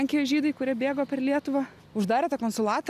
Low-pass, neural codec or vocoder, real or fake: 14.4 kHz; none; real